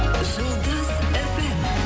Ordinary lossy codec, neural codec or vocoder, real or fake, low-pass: none; none; real; none